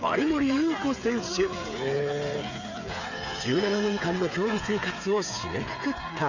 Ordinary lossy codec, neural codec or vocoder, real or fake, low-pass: none; codec, 16 kHz, 8 kbps, FreqCodec, smaller model; fake; 7.2 kHz